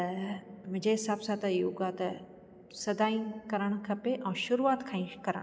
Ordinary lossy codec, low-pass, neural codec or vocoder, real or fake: none; none; none; real